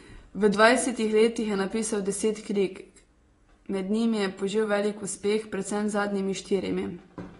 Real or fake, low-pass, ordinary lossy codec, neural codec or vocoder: fake; 10.8 kHz; AAC, 32 kbps; vocoder, 24 kHz, 100 mel bands, Vocos